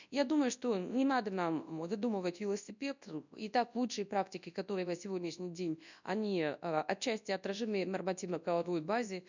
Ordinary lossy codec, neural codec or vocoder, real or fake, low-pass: none; codec, 24 kHz, 0.9 kbps, WavTokenizer, large speech release; fake; 7.2 kHz